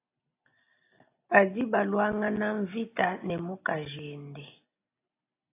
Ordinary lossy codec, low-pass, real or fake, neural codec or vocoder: AAC, 24 kbps; 3.6 kHz; real; none